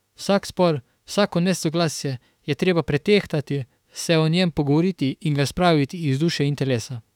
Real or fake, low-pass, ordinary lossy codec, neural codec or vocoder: fake; 19.8 kHz; none; autoencoder, 48 kHz, 32 numbers a frame, DAC-VAE, trained on Japanese speech